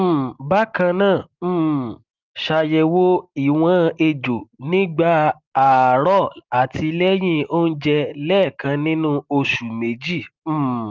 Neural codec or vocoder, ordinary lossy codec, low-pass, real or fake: none; Opus, 32 kbps; 7.2 kHz; real